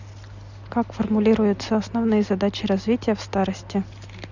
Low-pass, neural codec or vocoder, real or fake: 7.2 kHz; none; real